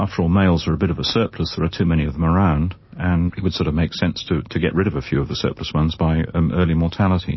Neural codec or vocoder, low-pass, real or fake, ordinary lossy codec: none; 7.2 kHz; real; MP3, 24 kbps